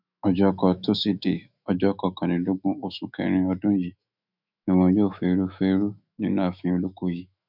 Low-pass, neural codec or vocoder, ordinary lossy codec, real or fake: 5.4 kHz; autoencoder, 48 kHz, 128 numbers a frame, DAC-VAE, trained on Japanese speech; none; fake